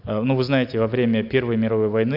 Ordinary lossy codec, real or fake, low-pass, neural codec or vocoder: none; real; 5.4 kHz; none